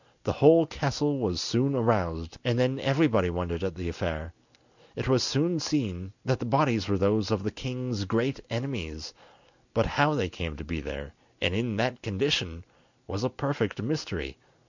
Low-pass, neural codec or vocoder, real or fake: 7.2 kHz; none; real